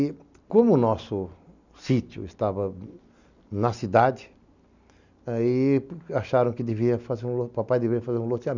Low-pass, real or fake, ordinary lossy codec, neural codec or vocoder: 7.2 kHz; real; none; none